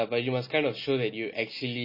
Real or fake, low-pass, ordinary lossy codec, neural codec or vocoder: real; 5.4 kHz; MP3, 24 kbps; none